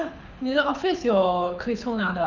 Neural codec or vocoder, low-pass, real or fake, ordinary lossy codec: codec, 24 kHz, 6 kbps, HILCodec; 7.2 kHz; fake; none